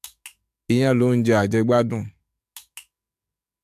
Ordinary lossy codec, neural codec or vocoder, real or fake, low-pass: AAC, 96 kbps; codec, 44.1 kHz, 7.8 kbps, DAC; fake; 14.4 kHz